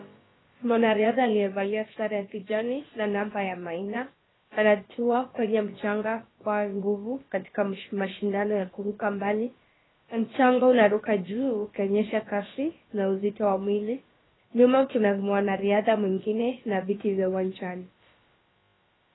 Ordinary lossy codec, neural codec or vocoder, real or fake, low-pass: AAC, 16 kbps; codec, 16 kHz, about 1 kbps, DyCAST, with the encoder's durations; fake; 7.2 kHz